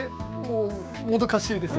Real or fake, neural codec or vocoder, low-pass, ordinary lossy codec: fake; codec, 16 kHz, 6 kbps, DAC; none; none